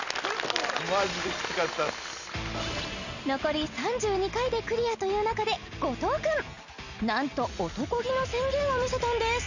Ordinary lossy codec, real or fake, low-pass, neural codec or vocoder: none; real; 7.2 kHz; none